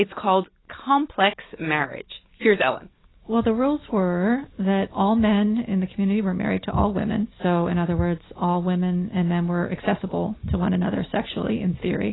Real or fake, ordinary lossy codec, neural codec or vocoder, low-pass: real; AAC, 16 kbps; none; 7.2 kHz